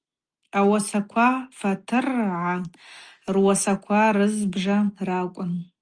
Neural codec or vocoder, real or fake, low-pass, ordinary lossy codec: none; real; 9.9 kHz; Opus, 32 kbps